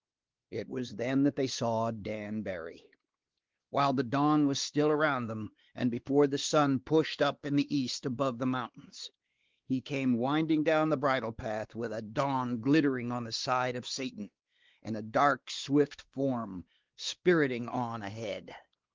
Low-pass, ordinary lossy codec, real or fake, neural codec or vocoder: 7.2 kHz; Opus, 16 kbps; fake; codec, 16 kHz, 2 kbps, X-Codec, WavLM features, trained on Multilingual LibriSpeech